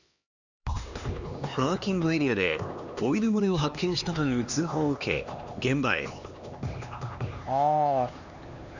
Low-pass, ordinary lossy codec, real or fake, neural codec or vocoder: 7.2 kHz; none; fake; codec, 16 kHz, 2 kbps, X-Codec, HuBERT features, trained on LibriSpeech